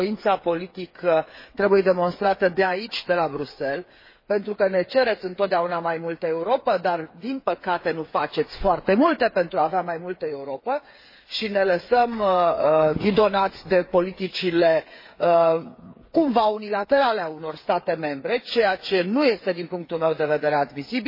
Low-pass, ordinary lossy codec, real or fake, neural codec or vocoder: 5.4 kHz; MP3, 24 kbps; fake; codec, 16 kHz, 8 kbps, FreqCodec, smaller model